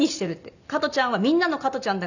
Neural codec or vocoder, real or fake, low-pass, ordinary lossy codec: none; real; 7.2 kHz; none